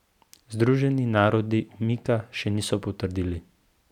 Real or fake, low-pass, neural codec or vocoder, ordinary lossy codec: real; 19.8 kHz; none; none